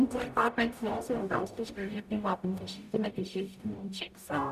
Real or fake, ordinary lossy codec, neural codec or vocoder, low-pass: fake; none; codec, 44.1 kHz, 0.9 kbps, DAC; 14.4 kHz